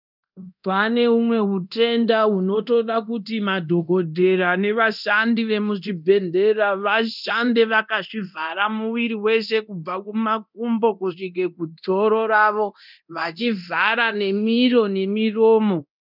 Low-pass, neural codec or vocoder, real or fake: 5.4 kHz; codec, 24 kHz, 0.9 kbps, DualCodec; fake